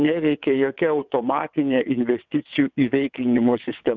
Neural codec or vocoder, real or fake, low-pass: vocoder, 22.05 kHz, 80 mel bands, WaveNeXt; fake; 7.2 kHz